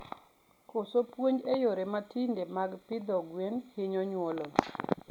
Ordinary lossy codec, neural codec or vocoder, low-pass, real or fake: none; none; 19.8 kHz; real